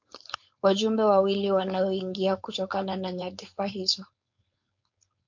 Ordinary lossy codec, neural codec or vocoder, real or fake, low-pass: MP3, 48 kbps; codec, 16 kHz, 4.8 kbps, FACodec; fake; 7.2 kHz